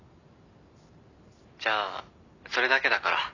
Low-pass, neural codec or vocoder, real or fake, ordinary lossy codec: 7.2 kHz; none; real; none